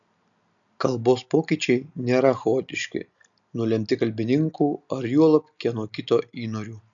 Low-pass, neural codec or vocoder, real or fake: 7.2 kHz; none; real